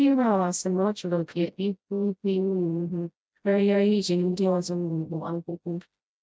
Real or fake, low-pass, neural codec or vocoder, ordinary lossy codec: fake; none; codec, 16 kHz, 0.5 kbps, FreqCodec, smaller model; none